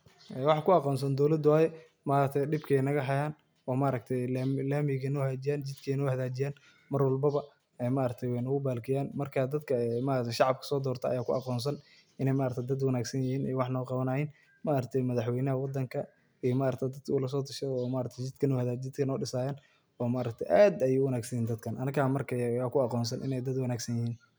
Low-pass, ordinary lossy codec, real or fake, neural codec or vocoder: none; none; real; none